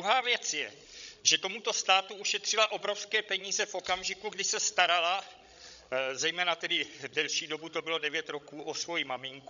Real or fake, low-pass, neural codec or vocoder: fake; 7.2 kHz; codec, 16 kHz, 16 kbps, FreqCodec, larger model